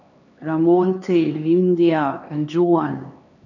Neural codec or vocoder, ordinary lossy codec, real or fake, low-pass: codec, 16 kHz, 2 kbps, X-Codec, HuBERT features, trained on LibriSpeech; none; fake; 7.2 kHz